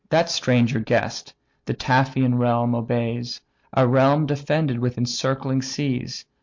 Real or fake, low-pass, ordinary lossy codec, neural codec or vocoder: real; 7.2 kHz; MP3, 48 kbps; none